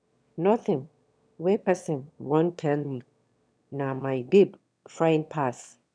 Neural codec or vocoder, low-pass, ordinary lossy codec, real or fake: autoencoder, 22.05 kHz, a latent of 192 numbers a frame, VITS, trained on one speaker; 9.9 kHz; none; fake